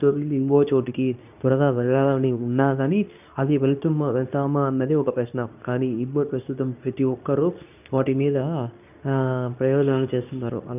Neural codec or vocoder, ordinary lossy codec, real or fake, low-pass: codec, 24 kHz, 0.9 kbps, WavTokenizer, medium speech release version 2; none; fake; 3.6 kHz